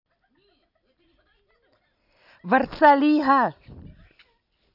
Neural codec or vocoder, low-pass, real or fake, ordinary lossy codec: none; 5.4 kHz; real; none